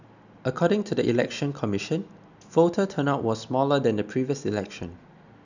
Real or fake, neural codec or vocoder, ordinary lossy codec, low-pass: real; none; none; 7.2 kHz